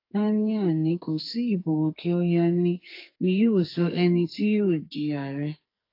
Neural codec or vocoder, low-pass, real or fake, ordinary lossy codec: codec, 44.1 kHz, 2.6 kbps, SNAC; 5.4 kHz; fake; AAC, 32 kbps